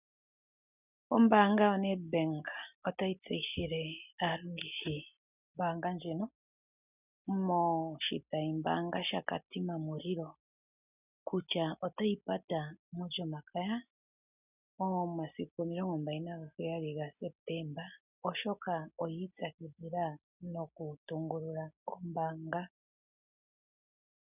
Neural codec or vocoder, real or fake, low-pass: none; real; 3.6 kHz